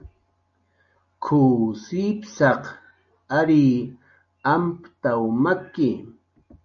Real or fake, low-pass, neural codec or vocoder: real; 7.2 kHz; none